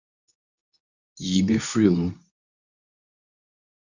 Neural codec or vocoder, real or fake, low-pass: codec, 24 kHz, 0.9 kbps, WavTokenizer, medium speech release version 2; fake; 7.2 kHz